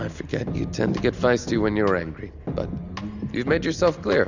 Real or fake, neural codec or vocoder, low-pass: real; none; 7.2 kHz